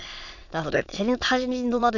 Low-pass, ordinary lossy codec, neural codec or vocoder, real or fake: 7.2 kHz; none; autoencoder, 22.05 kHz, a latent of 192 numbers a frame, VITS, trained on many speakers; fake